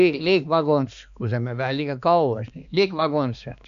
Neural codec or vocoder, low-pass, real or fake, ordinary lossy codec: codec, 16 kHz, 2 kbps, X-Codec, HuBERT features, trained on balanced general audio; 7.2 kHz; fake; none